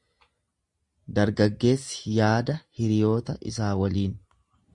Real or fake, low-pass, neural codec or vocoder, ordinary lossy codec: real; 10.8 kHz; none; Opus, 64 kbps